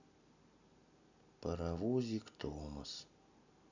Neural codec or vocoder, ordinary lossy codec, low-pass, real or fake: none; none; 7.2 kHz; real